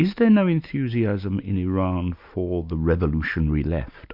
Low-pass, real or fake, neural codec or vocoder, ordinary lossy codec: 5.4 kHz; real; none; MP3, 48 kbps